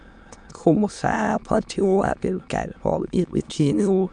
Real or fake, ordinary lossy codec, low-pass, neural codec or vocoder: fake; none; 9.9 kHz; autoencoder, 22.05 kHz, a latent of 192 numbers a frame, VITS, trained on many speakers